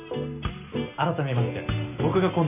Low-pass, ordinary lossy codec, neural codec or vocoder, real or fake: 3.6 kHz; none; none; real